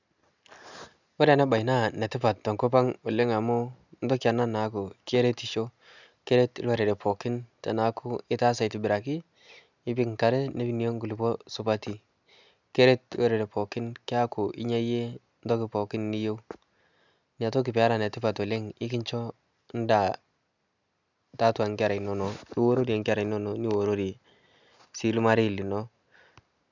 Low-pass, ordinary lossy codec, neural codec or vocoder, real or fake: 7.2 kHz; none; none; real